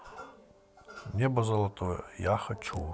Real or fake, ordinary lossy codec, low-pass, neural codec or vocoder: real; none; none; none